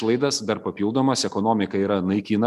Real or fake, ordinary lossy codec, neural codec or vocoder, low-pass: real; MP3, 96 kbps; none; 14.4 kHz